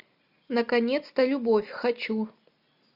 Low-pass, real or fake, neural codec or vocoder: 5.4 kHz; real; none